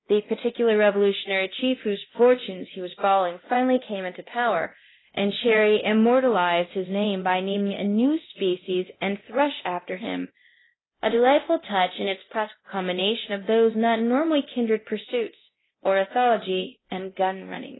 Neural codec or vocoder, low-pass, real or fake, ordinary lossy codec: codec, 24 kHz, 0.9 kbps, DualCodec; 7.2 kHz; fake; AAC, 16 kbps